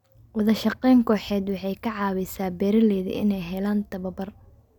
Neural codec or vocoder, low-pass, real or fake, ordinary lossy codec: none; 19.8 kHz; real; none